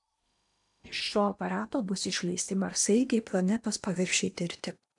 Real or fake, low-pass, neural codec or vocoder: fake; 10.8 kHz; codec, 16 kHz in and 24 kHz out, 0.8 kbps, FocalCodec, streaming, 65536 codes